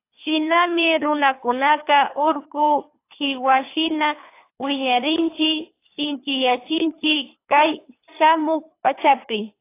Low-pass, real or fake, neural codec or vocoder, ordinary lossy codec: 3.6 kHz; fake; codec, 24 kHz, 3 kbps, HILCodec; AAC, 24 kbps